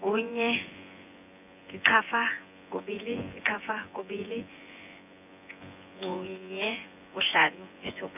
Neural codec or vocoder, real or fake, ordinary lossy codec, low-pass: vocoder, 24 kHz, 100 mel bands, Vocos; fake; none; 3.6 kHz